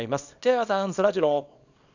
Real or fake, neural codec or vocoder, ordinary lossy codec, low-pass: fake; codec, 24 kHz, 0.9 kbps, WavTokenizer, small release; none; 7.2 kHz